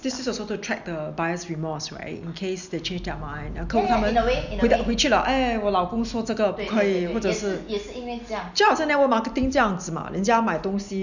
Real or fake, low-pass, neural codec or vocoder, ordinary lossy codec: real; 7.2 kHz; none; none